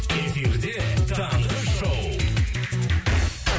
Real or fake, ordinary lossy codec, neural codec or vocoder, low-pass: real; none; none; none